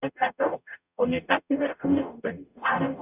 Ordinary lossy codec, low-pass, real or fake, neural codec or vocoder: none; 3.6 kHz; fake; codec, 44.1 kHz, 0.9 kbps, DAC